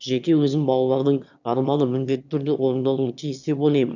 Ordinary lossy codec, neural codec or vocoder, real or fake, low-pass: none; autoencoder, 22.05 kHz, a latent of 192 numbers a frame, VITS, trained on one speaker; fake; 7.2 kHz